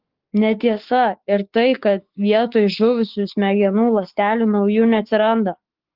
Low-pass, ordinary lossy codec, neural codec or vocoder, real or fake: 5.4 kHz; Opus, 16 kbps; codec, 16 kHz, 6 kbps, DAC; fake